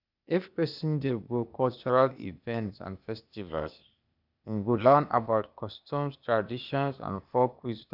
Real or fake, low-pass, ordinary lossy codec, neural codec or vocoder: fake; 5.4 kHz; none; codec, 16 kHz, 0.8 kbps, ZipCodec